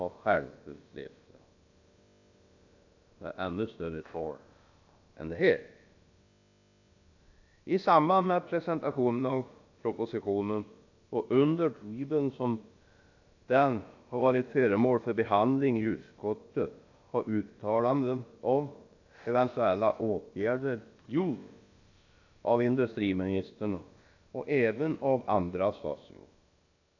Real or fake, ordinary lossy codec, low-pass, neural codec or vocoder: fake; AAC, 48 kbps; 7.2 kHz; codec, 16 kHz, about 1 kbps, DyCAST, with the encoder's durations